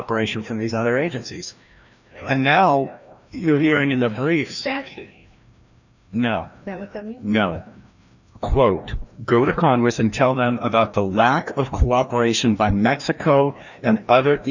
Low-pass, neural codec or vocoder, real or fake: 7.2 kHz; codec, 16 kHz, 1 kbps, FreqCodec, larger model; fake